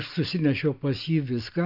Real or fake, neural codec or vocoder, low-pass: real; none; 5.4 kHz